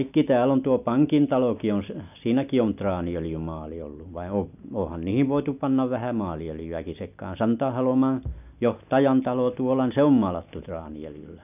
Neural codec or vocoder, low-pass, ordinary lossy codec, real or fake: none; 3.6 kHz; none; real